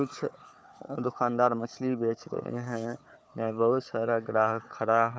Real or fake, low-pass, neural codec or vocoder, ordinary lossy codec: fake; none; codec, 16 kHz, 4 kbps, FunCodec, trained on Chinese and English, 50 frames a second; none